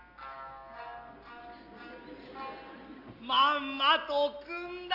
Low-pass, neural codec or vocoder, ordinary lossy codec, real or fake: 5.4 kHz; none; Opus, 64 kbps; real